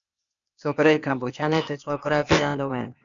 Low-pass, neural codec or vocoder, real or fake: 7.2 kHz; codec, 16 kHz, 0.8 kbps, ZipCodec; fake